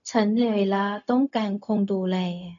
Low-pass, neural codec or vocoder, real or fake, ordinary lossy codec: 7.2 kHz; codec, 16 kHz, 0.4 kbps, LongCat-Audio-Codec; fake; none